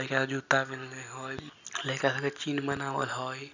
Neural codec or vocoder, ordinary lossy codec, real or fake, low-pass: none; none; real; 7.2 kHz